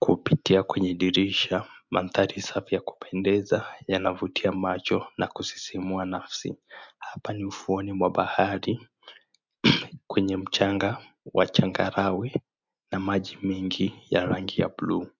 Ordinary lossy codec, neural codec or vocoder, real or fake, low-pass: MP3, 64 kbps; none; real; 7.2 kHz